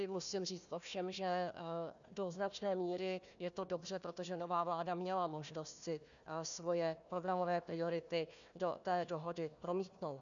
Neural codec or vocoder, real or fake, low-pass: codec, 16 kHz, 1 kbps, FunCodec, trained on Chinese and English, 50 frames a second; fake; 7.2 kHz